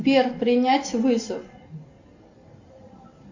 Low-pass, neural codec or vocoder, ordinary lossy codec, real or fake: 7.2 kHz; none; AAC, 48 kbps; real